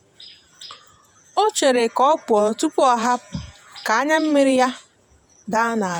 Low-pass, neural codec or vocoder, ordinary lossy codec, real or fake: none; vocoder, 48 kHz, 128 mel bands, Vocos; none; fake